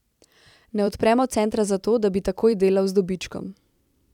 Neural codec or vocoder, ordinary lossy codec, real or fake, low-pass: vocoder, 44.1 kHz, 128 mel bands every 256 samples, BigVGAN v2; none; fake; 19.8 kHz